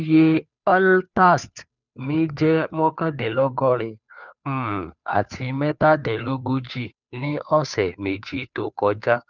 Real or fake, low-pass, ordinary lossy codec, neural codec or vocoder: fake; 7.2 kHz; none; codec, 16 kHz, 2 kbps, FunCodec, trained on Chinese and English, 25 frames a second